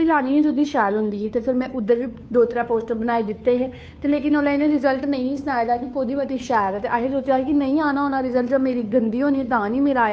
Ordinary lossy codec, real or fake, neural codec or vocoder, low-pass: none; fake; codec, 16 kHz, 2 kbps, FunCodec, trained on Chinese and English, 25 frames a second; none